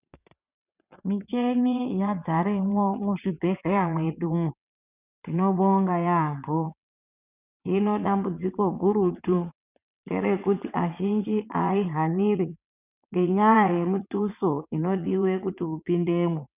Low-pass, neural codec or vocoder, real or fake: 3.6 kHz; vocoder, 22.05 kHz, 80 mel bands, WaveNeXt; fake